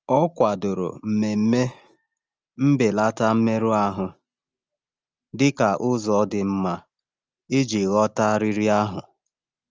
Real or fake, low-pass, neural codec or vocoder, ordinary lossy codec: real; 7.2 kHz; none; Opus, 24 kbps